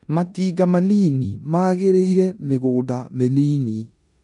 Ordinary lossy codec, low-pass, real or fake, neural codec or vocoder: none; 10.8 kHz; fake; codec, 16 kHz in and 24 kHz out, 0.9 kbps, LongCat-Audio-Codec, four codebook decoder